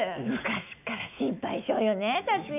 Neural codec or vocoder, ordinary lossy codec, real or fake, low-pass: autoencoder, 48 kHz, 128 numbers a frame, DAC-VAE, trained on Japanese speech; none; fake; 3.6 kHz